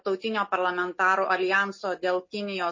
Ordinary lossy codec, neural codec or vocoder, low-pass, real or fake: MP3, 32 kbps; none; 7.2 kHz; real